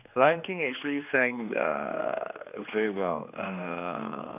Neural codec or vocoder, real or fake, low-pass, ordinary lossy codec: codec, 16 kHz, 2 kbps, X-Codec, HuBERT features, trained on general audio; fake; 3.6 kHz; none